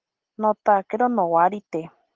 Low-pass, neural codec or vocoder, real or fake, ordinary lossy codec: 7.2 kHz; none; real; Opus, 16 kbps